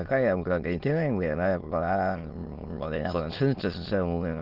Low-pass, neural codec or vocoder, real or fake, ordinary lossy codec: 5.4 kHz; autoencoder, 22.05 kHz, a latent of 192 numbers a frame, VITS, trained on many speakers; fake; Opus, 16 kbps